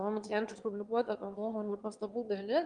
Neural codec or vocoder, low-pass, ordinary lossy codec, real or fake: autoencoder, 22.05 kHz, a latent of 192 numbers a frame, VITS, trained on one speaker; 9.9 kHz; Opus, 24 kbps; fake